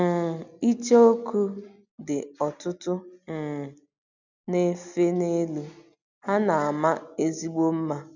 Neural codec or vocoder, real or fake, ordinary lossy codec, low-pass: none; real; none; 7.2 kHz